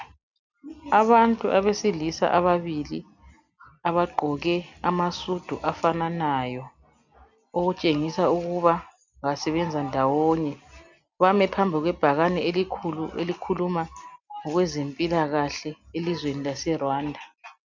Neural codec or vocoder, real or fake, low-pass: none; real; 7.2 kHz